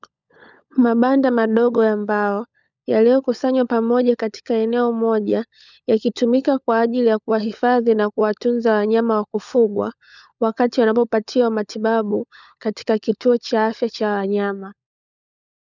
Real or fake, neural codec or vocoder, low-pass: fake; codec, 16 kHz, 16 kbps, FunCodec, trained on LibriTTS, 50 frames a second; 7.2 kHz